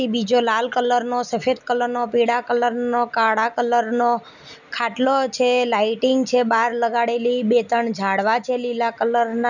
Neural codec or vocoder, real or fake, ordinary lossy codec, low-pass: none; real; none; 7.2 kHz